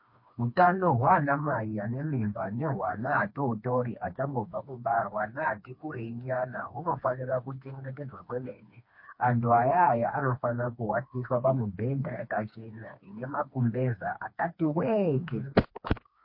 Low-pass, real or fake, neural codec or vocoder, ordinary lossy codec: 5.4 kHz; fake; codec, 16 kHz, 2 kbps, FreqCodec, smaller model; MP3, 32 kbps